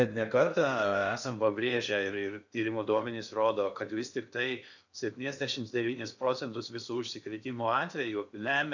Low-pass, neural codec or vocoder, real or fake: 7.2 kHz; codec, 16 kHz in and 24 kHz out, 0.8 kbps, FocalCodec, streaming, 65536 codes; fake